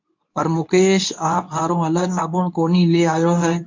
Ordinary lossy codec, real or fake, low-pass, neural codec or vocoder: MP3, 48 kbps; fake; 7.2 kHz; codec, 24 kHz, 0.9 kbps, WavTokenizer, medium speech release version 2